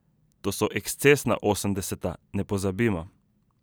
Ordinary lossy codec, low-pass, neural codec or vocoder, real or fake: none; none; none; real